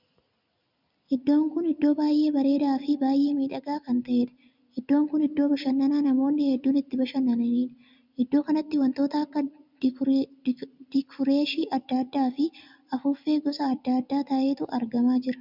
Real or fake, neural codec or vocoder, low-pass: real; none; 5.4 kHz